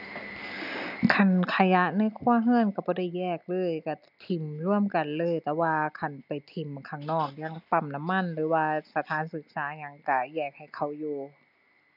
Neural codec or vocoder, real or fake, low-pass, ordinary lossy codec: none; real; 5.4 kHz; none